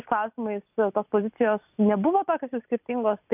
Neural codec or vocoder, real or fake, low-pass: none; real; 3.6 kHz